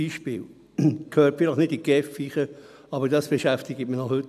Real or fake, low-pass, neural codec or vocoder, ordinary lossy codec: real; 14.4 kHz; none; none